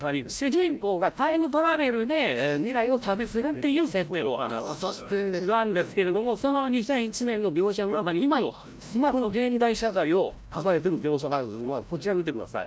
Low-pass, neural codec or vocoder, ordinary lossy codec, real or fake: none; codec, 16 kHz, 0.5 kbps, FreqCodec, larger model; none; fake